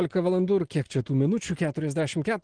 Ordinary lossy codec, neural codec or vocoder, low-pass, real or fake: Opus, 16 kbps; none; 9.9 kHz; real